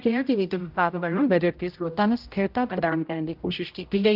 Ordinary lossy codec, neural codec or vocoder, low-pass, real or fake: Opus, 24 kbps; codec, 16 kHz, 0.5 kbps, X-Codec, HuBERT features, trained on general audio; 5.4 kHz; fake